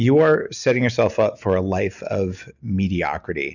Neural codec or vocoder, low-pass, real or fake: none; 7.2 kHz; real